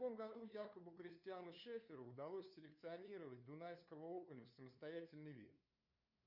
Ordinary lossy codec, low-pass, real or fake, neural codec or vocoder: Opus, 64 kbps; 5.4 kHz; fake; codec, 16 kHz, 2 kbps, FunCodec, trained on LibriTTS, 25 frames a second